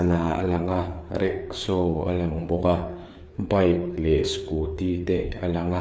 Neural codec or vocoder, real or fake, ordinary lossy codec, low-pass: codec, 16 kHz, 4 kbps, FreqCodec, larger model; fake; none; none